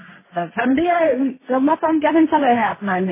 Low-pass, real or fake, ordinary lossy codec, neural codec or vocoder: 3.6 kHz; fake; MP3, 16 kbps; codec, 16 kHz, 1.1 kbps, Voila-Tokenizer